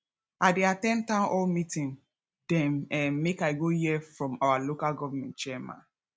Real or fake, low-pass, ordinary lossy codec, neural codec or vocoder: real; none; none; none